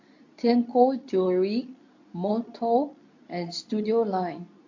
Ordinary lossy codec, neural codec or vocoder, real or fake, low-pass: AAC, 48 kbps; codec, 24 kHz, 0.9 kbps, WavTokenizer, medium speech release version 2; fake; 7.2 kHz